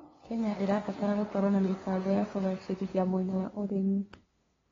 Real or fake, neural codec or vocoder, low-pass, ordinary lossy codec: fake; codec, 16 kHz, 2 kbps, FunCodec, trained on LibriTTS, 25 frames a second; 7.2 kHz; AAC, 24 kbps